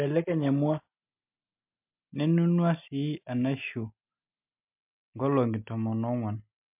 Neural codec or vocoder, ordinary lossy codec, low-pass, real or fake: none; MP3, 32 kbps; 3.6 kHz; real